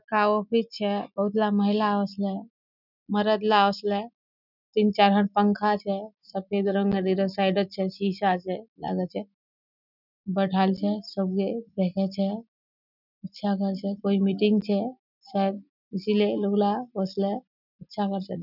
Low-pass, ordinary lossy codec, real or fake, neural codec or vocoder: 5.4 kHz; none; real; none